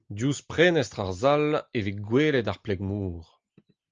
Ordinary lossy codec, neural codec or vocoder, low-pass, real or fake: Opus, 24 kbps; none; 7.2 kHz; real